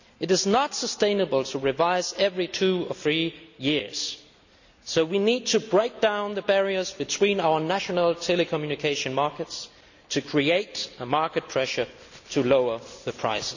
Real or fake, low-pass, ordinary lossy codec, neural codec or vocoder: real; 7.2 kHz; none; none